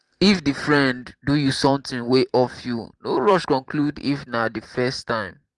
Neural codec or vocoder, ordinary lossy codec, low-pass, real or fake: vocoder, 44.1 kHz, 128 mel bands every 512 samples, BigVGAN v2; Opus, 24 kbps; 10.8 kHz; fake